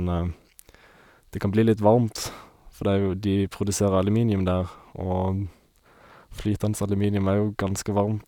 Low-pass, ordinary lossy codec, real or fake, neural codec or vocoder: 19.8 kHz; none; real; none